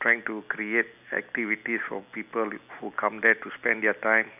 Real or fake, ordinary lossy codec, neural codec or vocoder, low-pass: real; none; none; 3.6 kHz